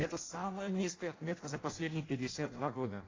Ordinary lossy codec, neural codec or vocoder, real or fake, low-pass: AAC, 32 kbps; codec, 16 kHz in and 24 kHz out, 0.6 kbps, FireRedTTS-2 codec; fake; 7.2 kHz